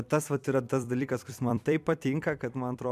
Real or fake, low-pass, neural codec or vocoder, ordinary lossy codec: fake; 14.4 kHz; vocoder, 44.1 kHz, 128 mel bands every 256 samples, BigVGAN v2; MP3, 96 kbps